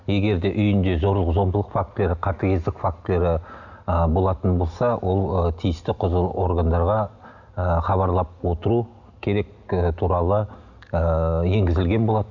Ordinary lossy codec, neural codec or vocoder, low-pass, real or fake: none; none; 7.2 kHz; real